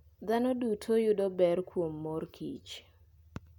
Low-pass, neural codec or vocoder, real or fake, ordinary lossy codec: 19.8 kHz; none; real; none